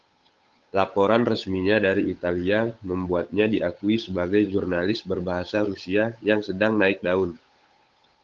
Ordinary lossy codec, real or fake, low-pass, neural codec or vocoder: Opus, 32 kbps; fake; 7.2 kHz; codec, 16 kHz, 8 kbps, FunCodec, trained on Chinese and English, 25 frames a second